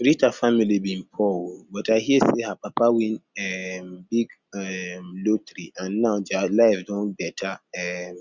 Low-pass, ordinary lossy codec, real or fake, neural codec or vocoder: 7.2 kHz; Opus, 64 kbps; real; none